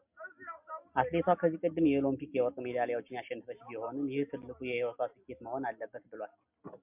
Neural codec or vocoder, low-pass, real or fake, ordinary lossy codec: none; 3.6 kHz; real; MP3, 32 kbps